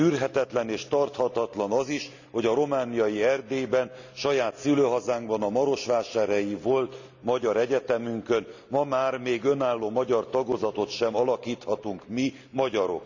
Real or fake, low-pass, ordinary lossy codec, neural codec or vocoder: real; 7.2 kHz; none; none